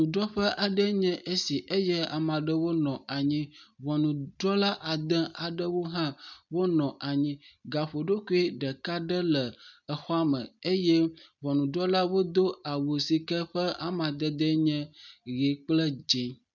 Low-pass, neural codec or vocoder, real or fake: 7.2 kHz; none; real